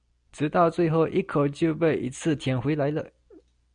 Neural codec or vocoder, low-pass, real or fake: none; 9.9 kHz; real